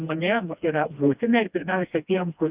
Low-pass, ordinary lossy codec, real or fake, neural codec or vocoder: 3.6 kHz; Opus, 64 kbps; fake; codec, 16 kHz, 1 kbps, FreqCodec, smaller model